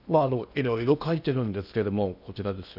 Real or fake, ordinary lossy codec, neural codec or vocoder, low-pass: fake; none; codec, 16 kHz in and 24 kHz out, 0.8 kbps, FocalCodec, streaming, 65536 codes; 5.4 kHz